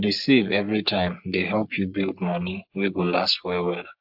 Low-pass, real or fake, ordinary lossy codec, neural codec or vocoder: 5.4 kHz; fake; AAC, 48 kbps; codec, 44.1 kHz, 3.4 kbps, Pupu-Codec